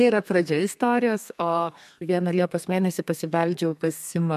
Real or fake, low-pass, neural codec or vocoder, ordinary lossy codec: fake; 14.4 kHz; codec, 32 kHz, 1.9 kbps, SNAC; MP3, 96 kbps